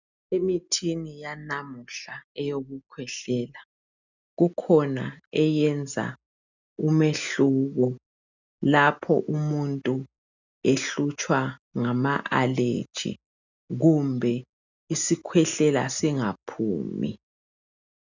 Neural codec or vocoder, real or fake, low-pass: none; real; 7.2 kHz